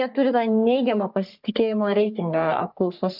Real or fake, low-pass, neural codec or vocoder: fake; 5.4 kHz; codec, 44.1 kHz, 3.4 kbps, Pupu-Codec